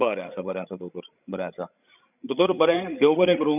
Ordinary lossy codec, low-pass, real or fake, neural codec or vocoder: none; 3.6 kHz; fake; codec, 16 kHz, 16 kbps, FreqCodec, larger model